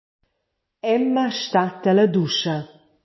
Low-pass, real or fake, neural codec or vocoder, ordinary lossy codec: 7.2 kHz; real; none; MP3, 24 kbps